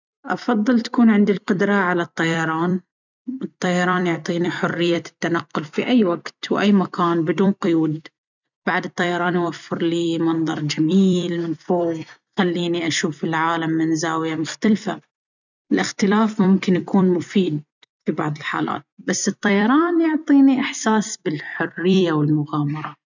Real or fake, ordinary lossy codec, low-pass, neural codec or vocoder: fake; none; 7.2 kHz; vocoder, 44.1 kHz, 128 mel bands every 256 samples, BigVGAN v2